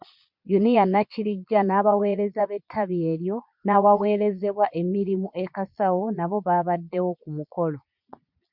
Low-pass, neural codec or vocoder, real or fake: 5.4 kHz; vocoder, 22.05 kHz, 80 mel bands, Vocos; fake